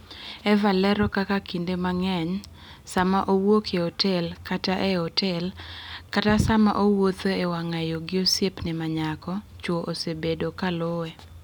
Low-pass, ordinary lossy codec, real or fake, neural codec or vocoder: 19.8 kHz; none; real; none